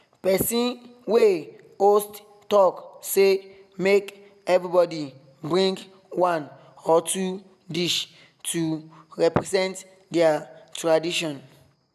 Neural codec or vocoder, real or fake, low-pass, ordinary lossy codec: none; real; 14.4 kHz; none